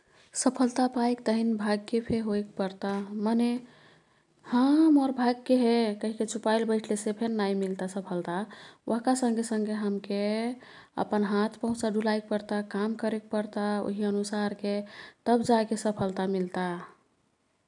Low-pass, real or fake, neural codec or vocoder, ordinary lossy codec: 10.8 kHz; real; none; MP3, 96 kbps